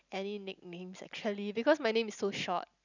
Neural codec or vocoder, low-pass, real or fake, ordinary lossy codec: none; 7.2 kHz; real; none